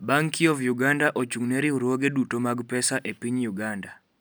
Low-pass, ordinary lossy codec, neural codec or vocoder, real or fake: none; none; none; real